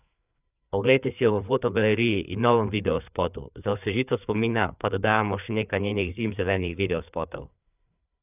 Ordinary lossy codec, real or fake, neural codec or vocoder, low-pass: none; fake; codec, 16 kHz, 4 kbps, FreqCodec, larger model; 3.6 kHz